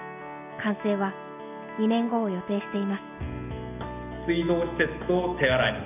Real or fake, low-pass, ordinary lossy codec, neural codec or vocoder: real; 3.6 kHz; none; none